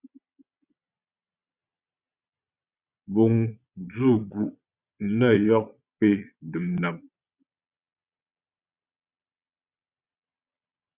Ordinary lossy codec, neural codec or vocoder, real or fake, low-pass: Opus, 64 kbps; vocoder, 22.05 kHz, 80 mel bands, Vocos; fake; 3.6 kHz